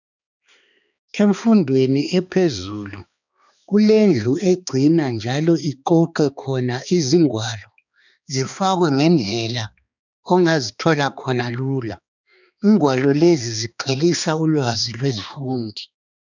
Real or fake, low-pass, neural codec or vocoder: fake; 7.2 kHz; codec, 16 kHz, 2 kbps, X-Codec, HuBERT features, trained on balanced general audio